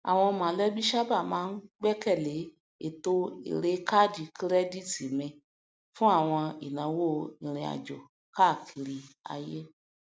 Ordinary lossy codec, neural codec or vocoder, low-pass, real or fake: none; none; none; real